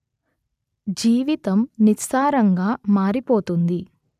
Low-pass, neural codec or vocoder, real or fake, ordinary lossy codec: 14.4 kHz; none; real; none